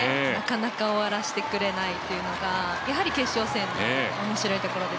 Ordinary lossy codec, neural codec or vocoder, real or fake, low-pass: none; none; real; none